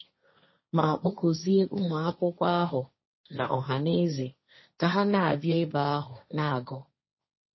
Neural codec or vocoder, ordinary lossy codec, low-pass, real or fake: codec, 16 kHz, 1.1 kbps, Voila-Tokenizer; MP3, 24 kbps; 7.2 kHz; fake